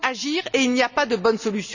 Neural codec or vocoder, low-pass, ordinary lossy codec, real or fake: none; 7.2 kHz; none; real